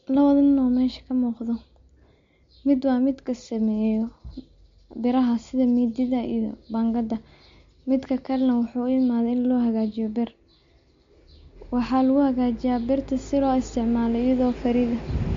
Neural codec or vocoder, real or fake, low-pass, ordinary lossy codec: none; real; 7.2 kHz; MP3, 48 kbps